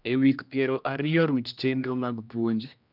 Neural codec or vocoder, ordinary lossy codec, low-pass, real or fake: codec, 16 kHz, 1 kbps, X-Codec, HuBERT features, trained on general audio; none; 5.4 kHz; fake